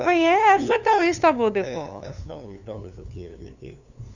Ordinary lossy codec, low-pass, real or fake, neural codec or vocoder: none; 7.2 kHz; fake; codec, 16 kHz, 2 kbps, FunCodec, trained on LibriTTS, 25 frames a second